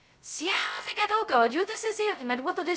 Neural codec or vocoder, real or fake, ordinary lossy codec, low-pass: codec, 16 kHz, 0.2 kbps, FocalCodec; fake; none; none